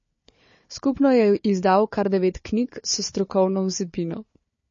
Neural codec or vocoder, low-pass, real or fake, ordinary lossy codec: codec, 16 kHz, 4 kbps, FunCodec, trained on Chinese and English, 50 frames a second; 7.2 kHz; fake; MP3, 32 kbps